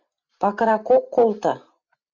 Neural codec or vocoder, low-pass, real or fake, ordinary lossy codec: none; 7.2 kHz; real; Opus, 64 kbps